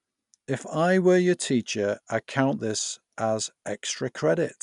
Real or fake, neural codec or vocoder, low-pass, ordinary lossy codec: real; none; 10.8 kHz; none